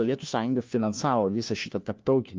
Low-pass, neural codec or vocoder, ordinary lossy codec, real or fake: 7.2 kHz; codec, 16 kHz, 1 kbps, FunCodec, trained on LibriTTS, 50 frames a second; Opus, 24 kbps; fake